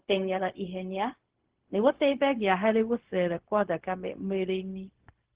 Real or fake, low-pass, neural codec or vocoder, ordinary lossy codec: fake; 3.6 kHz; codec, 16 kHz, 0.4 kbps, LongCat-Audio-Codec; Opus, 16 kbps